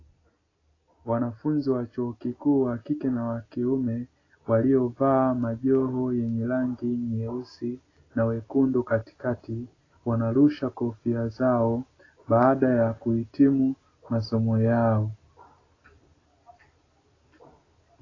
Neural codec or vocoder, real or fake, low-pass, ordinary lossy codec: none; real; 7.2 kHz; AAC, 32 kbps